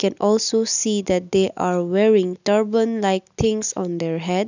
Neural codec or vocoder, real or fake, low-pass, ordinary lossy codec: none; real; 7.2 kHz; none